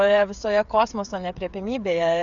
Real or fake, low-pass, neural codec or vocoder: fake; 7.2 kHz; codec, 16 kHz, 8 kbps, FreqCodec, smaller model